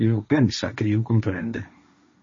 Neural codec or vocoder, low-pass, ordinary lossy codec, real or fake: codec, 16 kHz, 1.1 kbps, Voila-Tokenizer; 7.2 kHz; MP3, 32 kbps; fake